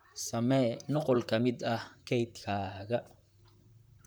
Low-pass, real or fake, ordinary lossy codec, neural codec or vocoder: none; fake; none; vocoder, 44.1 kHz, 128 mel bands, Pupu-Vocoder